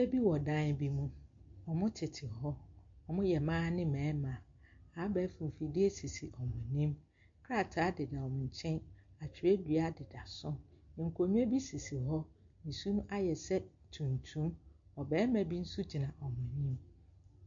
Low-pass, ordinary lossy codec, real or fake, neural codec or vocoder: 7.2 kHz; MP3, 48 kbps; real; none